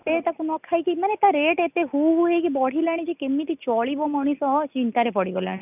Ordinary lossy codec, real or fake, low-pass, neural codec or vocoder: none; real; 3.6 kHz; none